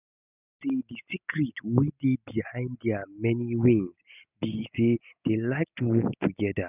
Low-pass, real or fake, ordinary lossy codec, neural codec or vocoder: 3.6 kHz; real; none; none